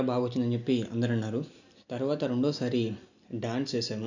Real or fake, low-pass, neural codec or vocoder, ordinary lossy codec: real; 7.2 kHz; none; none